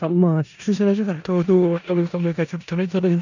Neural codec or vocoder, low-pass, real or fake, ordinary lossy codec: codec, 16 kHz in and 24 kHz out, 0.4 kbps, LongCat-Audio-Codec, four codebook decoder; 7.2 kHz; fake; none